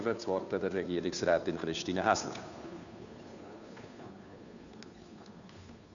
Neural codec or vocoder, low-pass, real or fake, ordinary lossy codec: codec, 16 kHz, 2 kbps, FunCodec, trained on Chinese and English, 25 frames a second; 7.2 kHz; fake; none